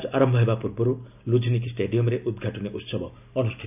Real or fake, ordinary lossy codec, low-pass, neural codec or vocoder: real; AAC, 32 kbps; 3.6 kHz; none